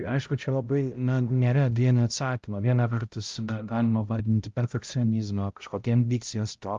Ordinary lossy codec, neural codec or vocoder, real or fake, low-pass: Opus, 32 kbps; codec, 16 kHz, 0.5 kbps, X-Codec, HuBERT features, trained on balanced general audio; fake; 7.2 kHz